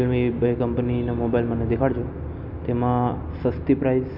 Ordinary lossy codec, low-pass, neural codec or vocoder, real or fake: none; 5.4 kHz; none; real